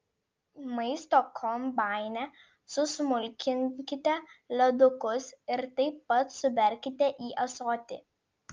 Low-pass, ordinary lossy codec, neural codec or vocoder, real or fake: 7.2 kHz; Opus, 24 kbps; none; real